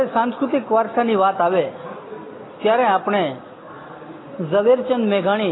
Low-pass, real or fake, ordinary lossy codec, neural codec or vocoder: 7.2 kHz; real; AAC, 16 kbps; none